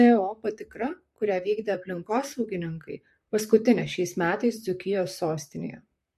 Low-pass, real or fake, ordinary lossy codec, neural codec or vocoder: 14.4 kHz; fake; MP3, 64 kbps; vocoder, 44.1 kHz, 128 mel bands, Pupu-Vocoder